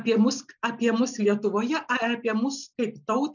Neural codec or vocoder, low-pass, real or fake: none; 7.2 kHz; real